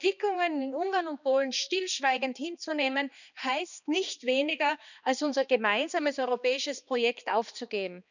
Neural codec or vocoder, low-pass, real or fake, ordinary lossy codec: codec, 16 kHz, 2 kbps, X-Codec, HuBERT features, trained on balanced general audio; 7.2 kHz; fake; none